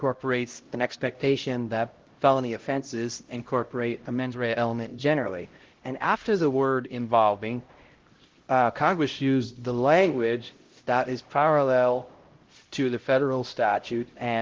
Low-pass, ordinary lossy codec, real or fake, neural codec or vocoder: 7.2 kHz; Opus, 16 kbps; fake; codec, 16 kHz, 0.5 kbps, X-Codec, HuBERT features, trained on LibriSpeech